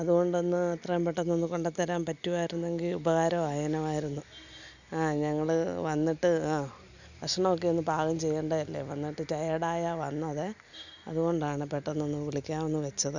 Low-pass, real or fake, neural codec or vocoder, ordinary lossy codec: 7.2 kHz; real; none; none